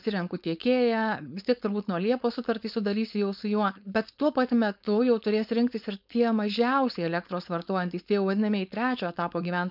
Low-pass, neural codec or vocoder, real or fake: 5.4 kHz; codec, 16 kHz, 4.8 kbps, FACodec; fake